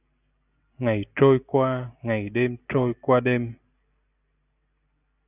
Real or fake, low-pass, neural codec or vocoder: real; 3.6 kHz; none